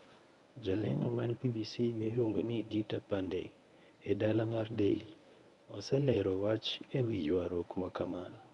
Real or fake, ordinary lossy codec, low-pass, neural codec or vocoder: fake; none; 10.8 kHz; codec, 24 kHz, 0.9 kbps, WavTokenizer, medium speech release version 1